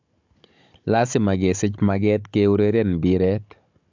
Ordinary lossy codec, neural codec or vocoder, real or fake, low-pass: MP3, 64 kbps; codec, 16 kHz, 16 kbps, FunCodec, trained on Chinese and English, 50 frames a second; fake; 7.2 kHz